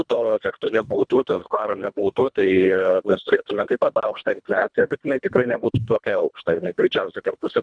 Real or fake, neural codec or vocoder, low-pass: fake; codec, 24 kHz, 1.5 kbps, HILCodec; 9.9 kHz